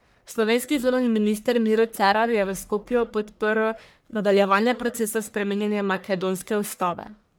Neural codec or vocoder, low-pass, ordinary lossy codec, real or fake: codec, 44.1 kHz, 1.7 kbps, Pupu-Codec; none; none; fake